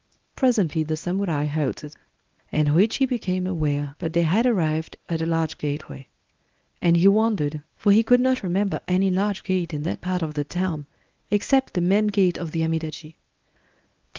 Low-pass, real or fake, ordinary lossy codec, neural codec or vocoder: 7.2 kHz; fake; Opus, 32 kbps; codec, 24 kHz, 0.9 kbps, WavTokenizer, medium speech release version 1